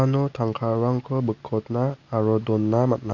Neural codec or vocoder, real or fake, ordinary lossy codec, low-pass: none; real; none; 7.2 kHz